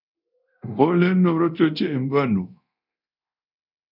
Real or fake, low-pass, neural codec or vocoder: fake; 5.4 kHz; codec, 24 kHz, 0.9 kbps, DualCodec